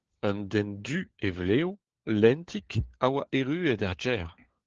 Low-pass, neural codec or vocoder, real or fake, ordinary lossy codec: 7.2 kHz; codec, 16 kHz, 4 kbps, FunCodec, trained on LibriTTS, 50 frames a second; fake; Opus, 16 kbps